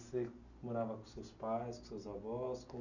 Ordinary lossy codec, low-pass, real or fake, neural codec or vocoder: none; 7.2 kHz; real; none